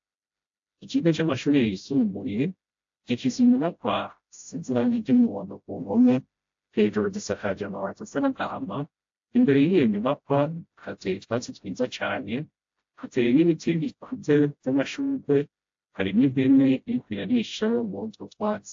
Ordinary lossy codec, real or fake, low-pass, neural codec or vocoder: AAC, 48 kbps; fake; 7.2 kHz; codec, 16 kHz, 0.5 kbps, FreqCodec, smaller model